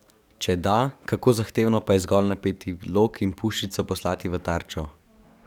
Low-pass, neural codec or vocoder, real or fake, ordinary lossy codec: 19.8 kHz; codec, 44.1 kHz, 7.8 kbps, DAC; fake; none